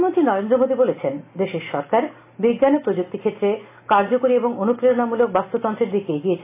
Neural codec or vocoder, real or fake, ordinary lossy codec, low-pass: none; real; AAC, 24 kbps; 3.6 kHz